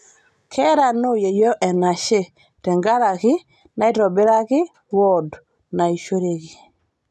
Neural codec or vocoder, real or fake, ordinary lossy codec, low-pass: none; real; none; none